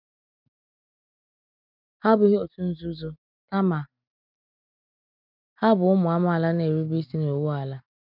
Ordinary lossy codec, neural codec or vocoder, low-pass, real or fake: none; none; 5.4 kHz; real